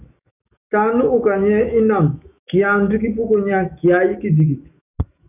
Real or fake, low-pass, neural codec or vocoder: fake; 3.6 kHz; autoencoder, 48 kHz, 128 numbers a frame, DAC-VAE, trained on Japanese speech